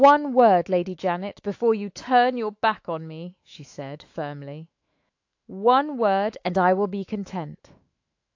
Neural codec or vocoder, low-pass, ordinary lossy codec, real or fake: autoencoder, 48 kHz, 128 numbers a frame, DAC-VAE, trained on Japanese speech; 7.2 kHz; AAC, 48 kbps; fake